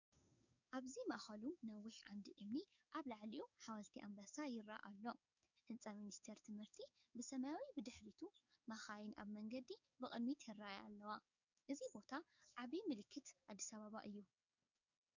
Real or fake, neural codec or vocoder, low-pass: fake; codec, 44.1 kHz, 7.8 kbps, DAC; 7.2 kHz